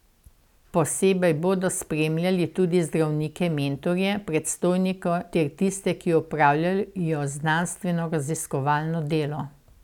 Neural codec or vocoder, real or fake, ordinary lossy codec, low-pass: none; real; none; 19.8 kHz